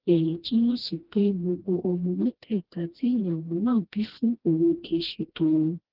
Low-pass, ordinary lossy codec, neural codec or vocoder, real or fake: 5.4 kHz; Opus, 16 kbps; codec, 16 kHz, 1 kbps, FreqCodec, smaller model; fake